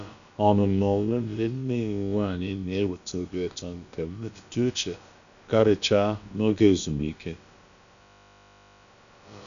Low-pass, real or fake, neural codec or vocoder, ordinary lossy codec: 7.2 kHz; fake; codec, 16 kHz, about 1 kbps, DyCAST, with the encoder's durations; none